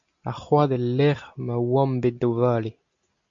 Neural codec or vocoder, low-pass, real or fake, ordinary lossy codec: none; 7.2 kHz; real; MP3, 96 kbps